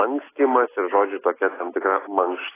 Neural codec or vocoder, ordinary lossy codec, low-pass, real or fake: none; AAC, 16 kbps; 3.6 kHz; real